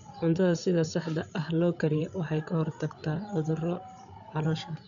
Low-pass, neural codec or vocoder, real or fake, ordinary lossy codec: 7.2 kHz; codec, 16 kHz, 8 kbps, FreqCodec, larger model; fake; none